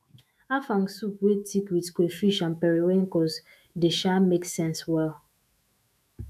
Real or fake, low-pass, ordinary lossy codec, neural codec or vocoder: fake; 14.4 kHz; none; autoencoder, 48 kHz, 128 numbers a frame, DAC-VAE, trained on Japanese speech